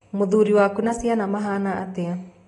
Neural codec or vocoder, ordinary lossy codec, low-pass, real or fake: none; AAC, 32 kbps; 10.8 kHz; real